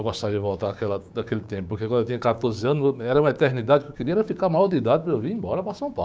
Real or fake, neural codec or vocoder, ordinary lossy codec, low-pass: fake; codec, 16 kHz, 6 kbps, DAC; none; none